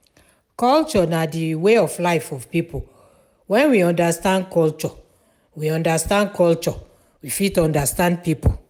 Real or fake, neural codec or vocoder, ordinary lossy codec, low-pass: real; none; none; 19.8 kHz